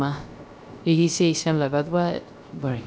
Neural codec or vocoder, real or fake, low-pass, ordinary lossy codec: codec, 16 kHz, 0.3 kbps, FocalCodec; fake; none; none